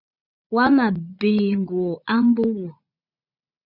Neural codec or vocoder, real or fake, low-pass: vocoder, 44.1 kHz, 80 mel bands, Vocos; fake; 5.4 kHz